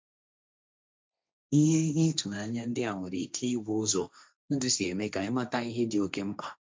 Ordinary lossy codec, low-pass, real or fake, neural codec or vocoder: none; none; fake; codec, 16 kHz, 1.1 kbps, Voila-Tokenizer